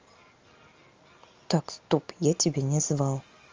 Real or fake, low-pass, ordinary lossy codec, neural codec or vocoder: real; 7.2 kHz; Opus, 32 kbps; none